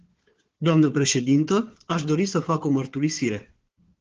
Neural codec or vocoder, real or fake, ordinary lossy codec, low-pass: codec, 16 kHz, 4 kbps, FunCodec, trained on Chinese and English, 50 frames a second; fake; Opus, 16 kbps; 7.2 kHz